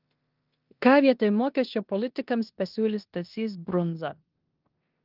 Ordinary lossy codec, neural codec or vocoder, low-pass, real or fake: Opus, 32 kbps; codec, 16 kHz in and 24 kHz out, 0.9 kbps, LongCat-Audio-Codec, four codebook decoder; 5.4 kHz; fake